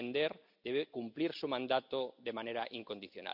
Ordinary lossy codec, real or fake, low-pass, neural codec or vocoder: none; real; 5.4 kHz; none